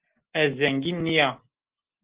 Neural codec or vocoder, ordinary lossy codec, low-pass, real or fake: none; Opus, 16 kbps; 3.6 kHz; real